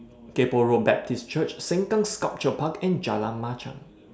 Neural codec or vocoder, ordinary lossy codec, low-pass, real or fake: none; none; none; real